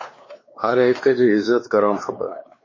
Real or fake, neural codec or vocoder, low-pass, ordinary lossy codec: fake; codec, 16 kHz, 2 kbps, X-Codec, WavLM features, trained on Multilingual LibriSpeech; 7.2 kHz; MP3, 32 kbps